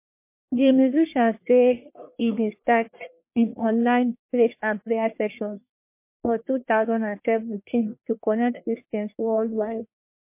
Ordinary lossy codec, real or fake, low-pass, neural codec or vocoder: MP3, 24 kbps; fake; 3.6 kHz; codec, 44.1 kHz, 1.7 kbps, Pupu-Codec